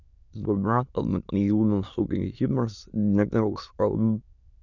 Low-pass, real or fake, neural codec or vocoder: 7.2 kHz; fake; autoencoder, 22.05 kHz, a latent of 192 numbers a frame, VITS, trained on many speakers